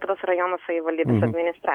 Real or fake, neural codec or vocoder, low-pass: real; none; 19.8 kHz